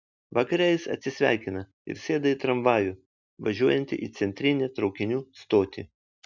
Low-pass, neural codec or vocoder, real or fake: 7.2 kHz; none; real